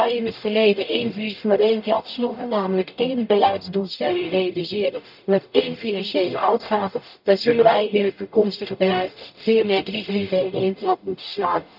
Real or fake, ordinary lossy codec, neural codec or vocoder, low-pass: fake; none; codec, 44.1 kHz, 0.9 kbps, DAC; 5.4 kHz